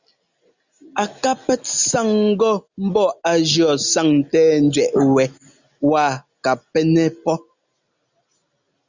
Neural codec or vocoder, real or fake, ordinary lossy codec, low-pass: none; real; Opus, 64 kbps; 7.2 kHz